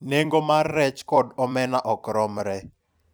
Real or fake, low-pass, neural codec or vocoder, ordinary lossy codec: fake; none; vocoder, 44.1 kHz, 128 mel bands every 256 samples, BigVGAN v2; none